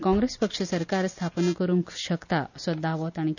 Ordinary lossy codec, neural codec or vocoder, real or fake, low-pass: none; none; real; 7.2 kHz